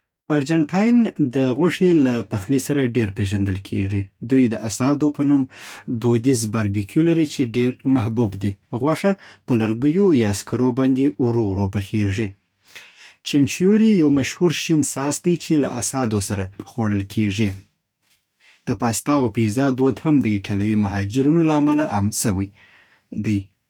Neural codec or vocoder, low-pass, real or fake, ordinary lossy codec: codec, 44.1 kHz, 2.6 kbps, DAC; 19.8 kHz; fake; none